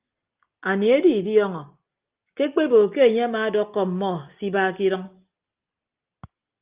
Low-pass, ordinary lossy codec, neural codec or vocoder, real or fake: 3.6 kHz; Opus, 24 kbps; none; real